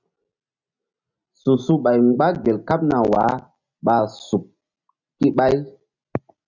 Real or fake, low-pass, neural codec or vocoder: real; 7.2 kHz; none